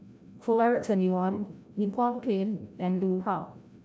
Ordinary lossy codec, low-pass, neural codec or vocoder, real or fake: none; none; codec, 16 kHz, 0.5 kbps, FreqCodec, larger model; fake